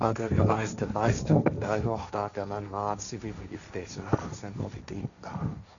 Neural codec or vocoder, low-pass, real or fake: codec, 16 kHz, 1.1 kbps, Voila-Tokenizer; 7.2 kHz; fake